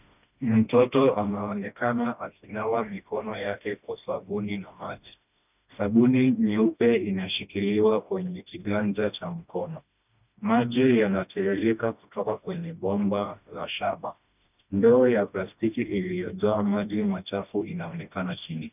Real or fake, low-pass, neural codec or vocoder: fake; 3.6 kHz; codec, 16 kHz, 1 kbps, FreqCodec, smaller model